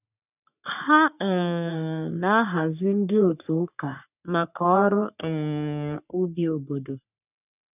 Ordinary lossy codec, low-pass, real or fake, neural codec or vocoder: none; 3.6 kHz; fake; codec, 44.1 kHz, 3.4 kbps, Pupu-Codec